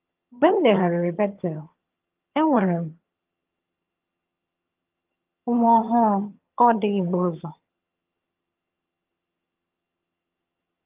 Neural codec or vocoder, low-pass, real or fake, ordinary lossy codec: vocoder, 22.05 kHz, 80 mel bands, HiFi-GAN; 3.6 kHz; fake; Opus, 24 kbps